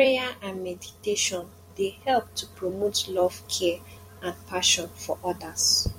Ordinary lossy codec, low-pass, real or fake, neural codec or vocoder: MP3, 64 kbps; 19.8 kHz; fake; vocoder, 44.1 kHz, 128 mel bands every 256 samples, BigVGAN v2